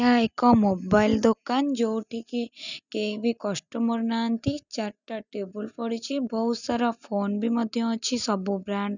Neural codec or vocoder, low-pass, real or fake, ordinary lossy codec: none; 7.2 kHz; real; none